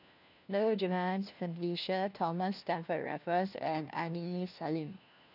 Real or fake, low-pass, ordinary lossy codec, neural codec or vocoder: fake; 5.4 kHz; none; codec, 16 kHz, 1 kbps, FunCodec, trained on LibriTTS, 50 frames a second